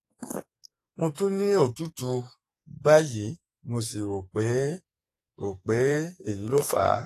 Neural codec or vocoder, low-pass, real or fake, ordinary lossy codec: codec, 44.1 kHz, 2.6 kbps, SNAC; 14.4 kHz; fake; AAC, 48 kbps